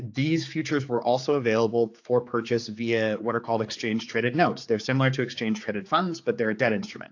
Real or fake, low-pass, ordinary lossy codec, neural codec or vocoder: fake; 7.2 kHz; AAC, 48 kbps; codec, 16 kHz, 4 kbps, X-Codec, HuBERT features, trained on general audio